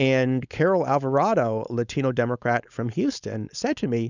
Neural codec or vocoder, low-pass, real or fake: codec, 16 kHz, 4.8 kbps, FACodec; 7.2 kHz; fake